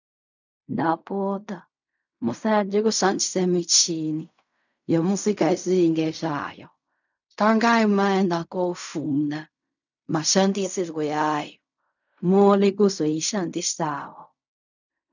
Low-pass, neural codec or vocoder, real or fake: 7.2 kHz; codec, 16 kHz in and 24 kHz out, 0.4 kbps, LongCat-Audio-Codec, fine tuned four codebook decoder; fake